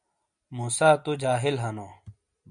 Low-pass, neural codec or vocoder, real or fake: 9.9 kHz; none; real